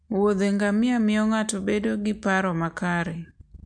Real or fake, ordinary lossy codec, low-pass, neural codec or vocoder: real; MP3, 64 kbps; 9.9 kHz; none